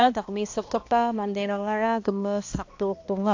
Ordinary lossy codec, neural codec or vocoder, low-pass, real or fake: AAC, 48 kbps; codec, 16 kHz, 1 kbps, X-Codec, HuBERT features, trained on balanced general audio; 7.2 kHz; fake